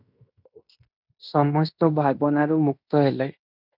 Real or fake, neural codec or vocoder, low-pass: fake; codec, 16 kHz in and 24 kHz out, 0.9 kbps, LongCat-Audio-Codec, fine tuned four codebook decoder; 5.4 kHz